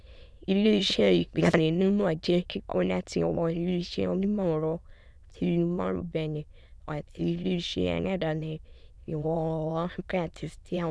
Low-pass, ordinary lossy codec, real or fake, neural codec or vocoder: none; none; fake; autoencoder, 22.05 kHz, a latent of 192 numbers a frame, VITS, trained on many speakers